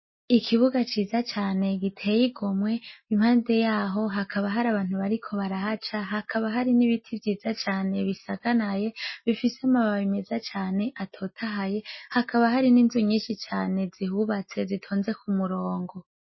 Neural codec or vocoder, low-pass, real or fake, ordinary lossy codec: none; 7.2 kHz; real; MP3, 24 kbps